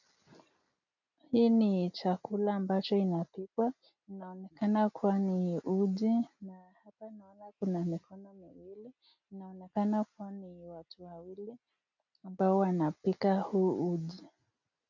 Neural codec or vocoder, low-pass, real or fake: none; 7.2 kHz; real